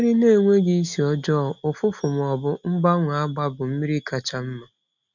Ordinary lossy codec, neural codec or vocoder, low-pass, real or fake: none; none; 7.2 kHz; real